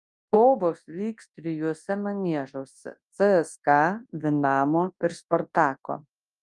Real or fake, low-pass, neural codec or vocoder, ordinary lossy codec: fake; 10.8 kHz; codec, 24 kHz, 0.9 kbps, WavTokenizer, large speech release; Opus, 32 kbps